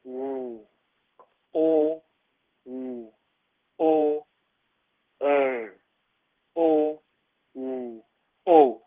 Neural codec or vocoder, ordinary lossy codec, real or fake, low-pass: codec, 16 kHz in and 24 kHz out, 1 kbps, XY-Tokenizer; Opus, 16 kbps; fake; 3.6 kHz